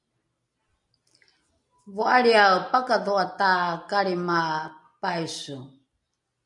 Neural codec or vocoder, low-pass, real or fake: none; 10.8 kHz; real